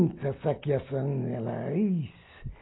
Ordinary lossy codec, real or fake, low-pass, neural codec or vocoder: AAC, 16 kbps; real; 7.2 kHz; none